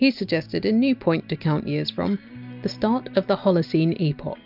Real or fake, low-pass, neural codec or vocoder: real; 5.4 kHz; none